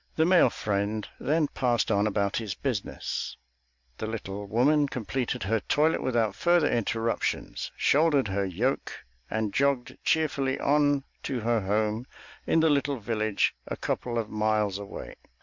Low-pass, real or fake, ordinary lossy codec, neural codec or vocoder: 7.2 kHz; real; MP3, 64 kbps; none